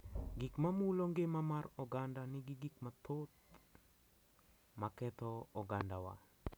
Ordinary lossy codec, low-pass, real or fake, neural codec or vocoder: none; none; real; none